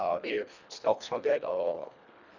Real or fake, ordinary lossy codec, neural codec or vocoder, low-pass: fake; none; codec, 24 kHz, 1.5 kbps, HILCodec; 7.2 kHz